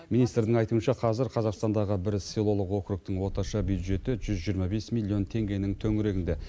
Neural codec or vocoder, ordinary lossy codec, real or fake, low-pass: none; none; real; none